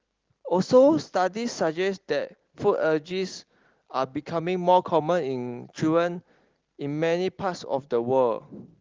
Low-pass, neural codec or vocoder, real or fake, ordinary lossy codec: 7.2 kHz; none; real; Opus, 16 kbps